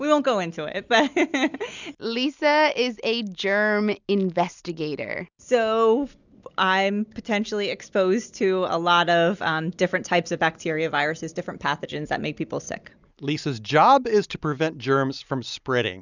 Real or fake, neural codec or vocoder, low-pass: real; none; 7.2 kHz